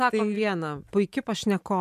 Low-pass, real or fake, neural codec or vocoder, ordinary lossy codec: 14.4 kHz; real; none; MP3, 96 kbps